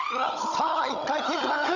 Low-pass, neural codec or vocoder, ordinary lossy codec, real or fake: 7.2 kHz; codec, 16 kHz, 16 kbps, FunCodec, trained on Chinese and English, 50 frames a second; none; fake